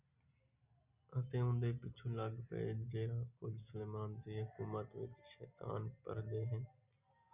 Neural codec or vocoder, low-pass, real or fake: none; 3.6 kHz; real